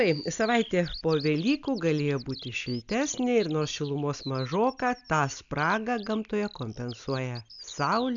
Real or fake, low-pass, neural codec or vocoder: real; 7.2 kHz; none